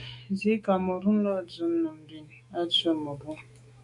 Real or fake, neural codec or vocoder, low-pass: fake; autoencoder, 48 kHz, 128 numbers a frame, DAC-VAE, trained on Japanese speech; 10.8 kHz